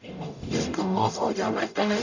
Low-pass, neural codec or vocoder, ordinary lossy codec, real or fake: 7.2 kHz; codec, 44.1 kHz, 0.9 kbps, DAC; none; fake